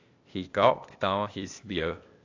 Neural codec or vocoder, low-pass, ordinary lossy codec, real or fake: codec, 16 kHz, 0.8 kbps, ZipCodec; 7.2 kHz; AAC, 48 kbps; fake